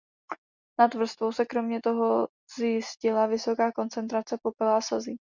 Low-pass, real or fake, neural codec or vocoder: 7.2 kHz; real; none